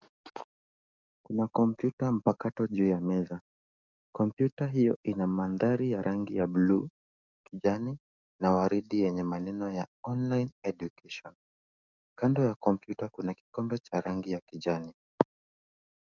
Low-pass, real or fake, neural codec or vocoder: 7.2 kHz; fake; codec, 44.1 kHz, 7.8 kbps, DAC